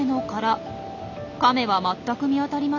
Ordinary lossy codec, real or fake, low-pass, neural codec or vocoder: none; real; 7.2 kHz; none